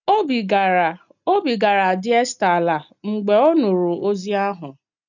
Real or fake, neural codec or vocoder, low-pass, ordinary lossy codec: real; none; 7.2 kHz; none